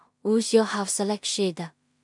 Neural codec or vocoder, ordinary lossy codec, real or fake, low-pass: codec, 16 kHz in and 24 kHz out, 0.4 kbps, LongCat-Audio-Codec, two codebook decoder; MP3, 64 kbps; fake; 10.8 kHz